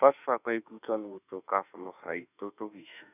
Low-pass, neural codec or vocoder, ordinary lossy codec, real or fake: 3.6 kHz; autoencoder, 48 kHz, 32 numbers a frame, DAC-VAE, trained on Japanese speech; none; fake